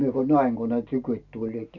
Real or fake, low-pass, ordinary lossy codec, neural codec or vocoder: real; 7.2 kHz; MP3, 64 kbps; none